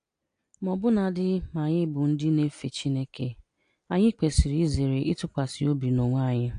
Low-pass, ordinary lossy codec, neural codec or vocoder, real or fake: 9.9 kHz; AAC, 48 kbps; none; real